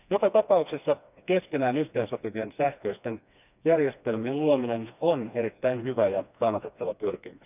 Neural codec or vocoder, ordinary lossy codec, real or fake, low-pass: codec, 16 kHz, 2 kbps, FreqCodec, smaller model; none; fake; 3.6 kHz